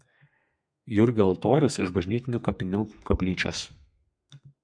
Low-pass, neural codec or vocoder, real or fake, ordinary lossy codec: 9.9 kHz; codec, 32 kHz, 1.9 kbps, SNAC; fake; MP3, 96 kbps